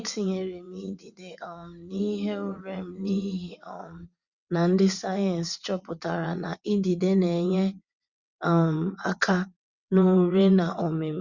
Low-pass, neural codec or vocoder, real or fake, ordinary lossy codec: 7.2 kHz; vocoder, 22.05 kHz, 80 mel bands, WaveNeXt; fake; Opus, 64 kbps